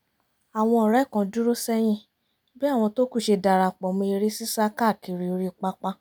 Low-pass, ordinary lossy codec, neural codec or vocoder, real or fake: none; none; none; real